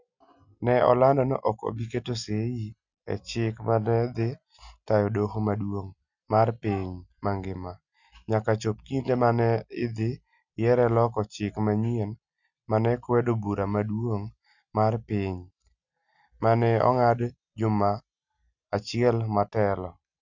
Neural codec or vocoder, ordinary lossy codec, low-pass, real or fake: none; none; 7.2 kHz; real